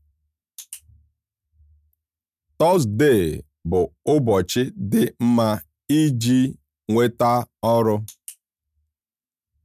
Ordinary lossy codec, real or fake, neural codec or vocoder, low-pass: none; real; none; 14.4 kHz